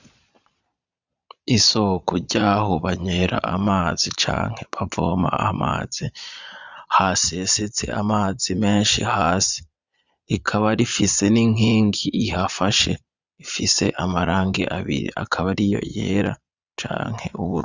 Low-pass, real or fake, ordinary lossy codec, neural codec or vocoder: 7.2 kHz; fake; Opus, 64 kbps; vocoder, 22.05 kHz, 80 mel bands, Vocos